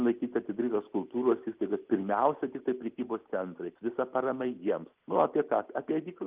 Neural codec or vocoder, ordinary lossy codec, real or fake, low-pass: none; Opus, 24 kbps; real; 3.6 kHz